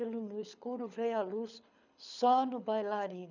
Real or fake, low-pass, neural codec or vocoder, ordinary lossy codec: fake; 7.2 kHz; codec, 24 kHz, 6 kbps, HILCodec; none